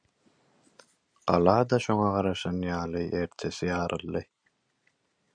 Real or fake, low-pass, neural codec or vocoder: real; 9.9 kHz; none